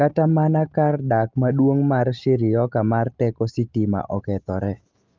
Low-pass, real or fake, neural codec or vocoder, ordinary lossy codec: 7.2 kHz; real; none; Opus, 24 kbps